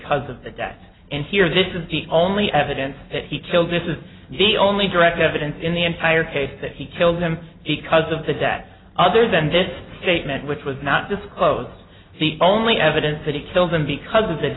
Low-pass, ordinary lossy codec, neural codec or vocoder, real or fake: 7.2 kHz; AAC, 16 kbps; none; real